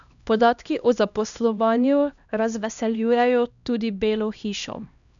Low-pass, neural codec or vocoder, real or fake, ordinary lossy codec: 7.2 kHz; codec, 16 kHz, 1 kbps, X-Codec, HuBERT features, trained on LibriSpeech; fake; none